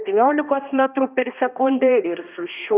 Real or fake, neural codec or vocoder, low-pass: fake; codec, 16 kHz, 1 kbps, X-Codec, HuBERT features, trained on balanced general audio; 3.6 kHz